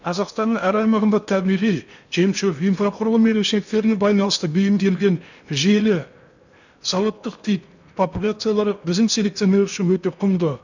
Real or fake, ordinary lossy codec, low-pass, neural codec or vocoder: fake; none; 7.2 kHz; codec, 16 kHz in and 24 kHz out, 0.8 kbps, FocalCodec, streaming, 65536 codes